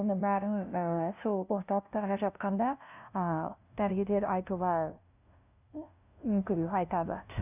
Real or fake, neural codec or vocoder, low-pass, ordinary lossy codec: fake; codec, 16 kHz, 0.5 kbps, FunCodec, trained on LibriTTS, 25 frames a second; 3.6 kHz; none